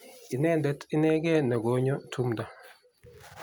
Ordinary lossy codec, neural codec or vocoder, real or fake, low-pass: none; none; real; none